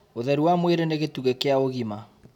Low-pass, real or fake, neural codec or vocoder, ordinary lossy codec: 19.8 kHz; real; none; none